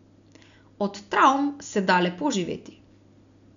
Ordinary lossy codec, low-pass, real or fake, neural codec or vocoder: none; 7.2 kHz; real; none